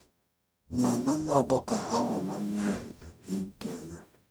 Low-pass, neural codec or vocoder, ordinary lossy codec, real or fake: none; codec, 44.1 kHz, 0.9 kbps, DAC; none; fake